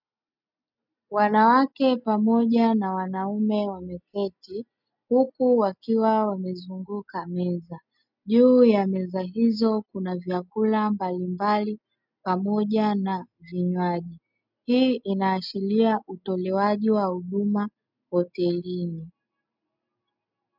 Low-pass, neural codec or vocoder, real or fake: 5.4 kHz; none; real